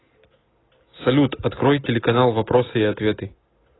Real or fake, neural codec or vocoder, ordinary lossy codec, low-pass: real; none; AAC, 16 kbps; 7.2 kHz